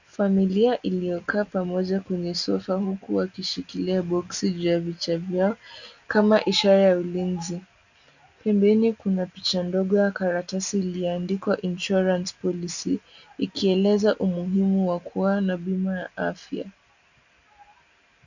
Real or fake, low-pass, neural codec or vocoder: real; 7.2 kHz; none